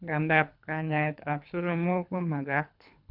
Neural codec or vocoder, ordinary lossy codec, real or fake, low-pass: codec, 24 kHz, 3 kbps, HILCodec; none; fake; 5.4 kHz